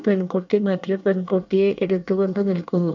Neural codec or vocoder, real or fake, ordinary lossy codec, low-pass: codec, 24 kHz, 1 kbps, SNAC; fake; none; 7.2 kHz